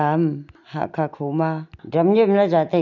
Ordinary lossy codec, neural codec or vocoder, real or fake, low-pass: none; none; real; 7.2 kHz